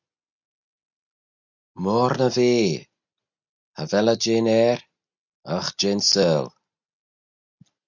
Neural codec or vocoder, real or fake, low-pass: none; real; 7.2 kHz